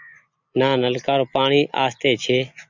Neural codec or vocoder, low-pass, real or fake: none; 7.2 kHz; real